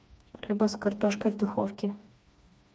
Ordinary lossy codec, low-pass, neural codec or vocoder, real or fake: none; none; codec, 16 kHz, 2 kbps, FreqCodec, smaller model; fake